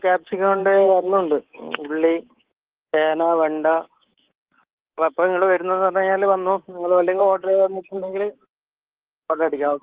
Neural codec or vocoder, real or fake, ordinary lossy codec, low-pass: codec, 24 kHz, 3.1 kbps, DualCodec; fake; Opus, 16 kbps; 3.6 kHz